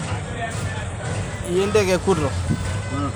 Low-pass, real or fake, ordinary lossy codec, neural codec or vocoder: none; real; none; none